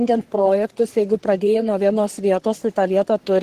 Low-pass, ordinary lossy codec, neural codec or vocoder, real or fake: 14.4 kHz; Opus, 16 kbps; codec, 44.1 kHz, 3.4 kbps, Pupu-Codec; fake